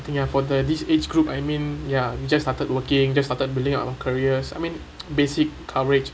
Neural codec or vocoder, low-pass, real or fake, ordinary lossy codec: none; none; real; none